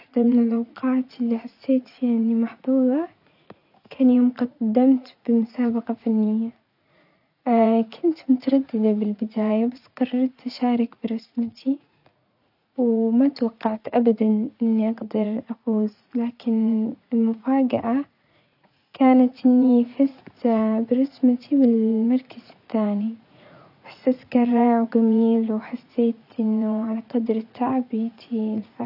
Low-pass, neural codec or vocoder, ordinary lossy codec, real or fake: 5.4 kHz; vocoder, 44.1 kHz, 80 mel bands, Vocos; none; fake